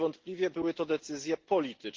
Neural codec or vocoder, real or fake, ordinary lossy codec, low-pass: none; real; Opus, 16 kbps; 7.2 kHz